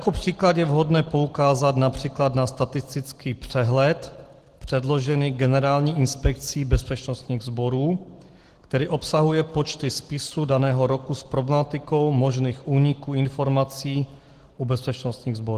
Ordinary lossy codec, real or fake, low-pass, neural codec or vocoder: Opus, 16 kbps; real; 14.4 kHz; none